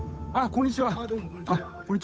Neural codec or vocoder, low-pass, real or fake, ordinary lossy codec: codec, 16 kHz, 8 kbps, FunCodec, trained on Chinese and English, 25 frames a second; none; fake; none